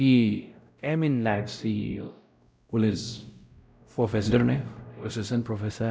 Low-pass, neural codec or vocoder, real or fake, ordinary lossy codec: none; codec, 16 kHz, 0.5 kbps, X-Codec, WavLM features, trained on Multilingual LibriSpeech; fake; none